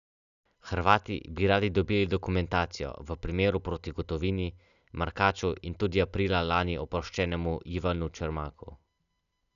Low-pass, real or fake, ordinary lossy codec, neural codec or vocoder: 7.2 kHz; real; none; none